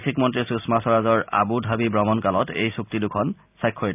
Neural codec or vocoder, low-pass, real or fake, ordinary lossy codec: none; 3.6 kHz; real; none